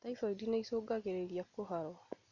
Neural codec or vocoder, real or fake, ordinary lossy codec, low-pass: none; real; none; 7.2 kHz